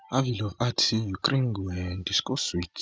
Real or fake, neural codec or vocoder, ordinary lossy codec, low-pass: real; none; none; none